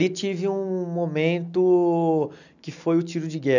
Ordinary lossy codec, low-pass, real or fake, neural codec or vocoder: none; 7.2 kHz; real; none